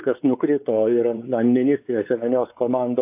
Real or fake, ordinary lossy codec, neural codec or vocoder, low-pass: fake; AAC, 32 kbps; codec, 16 kHz, 4 kbps, X-Codec, WavLM features, trained on Multilingual LibriSpeech; 3.6 kHz